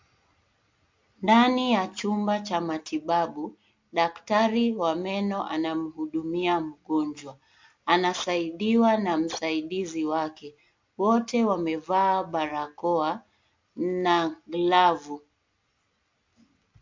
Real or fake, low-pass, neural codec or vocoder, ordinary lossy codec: real; 7.2 kHz; none; MP3, 48 kbps